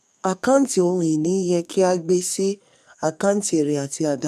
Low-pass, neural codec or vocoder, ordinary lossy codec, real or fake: 14.4 kHz; codec, 44.1 kHz, 2.6 kbps, SNAC; none; fake